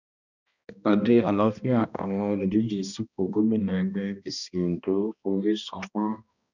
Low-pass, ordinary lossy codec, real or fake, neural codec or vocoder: 7.2 kHz; none; fake; codec, 16 kHz, 1 kbps, X-Codec, HuBERT features, trained on balanced general audio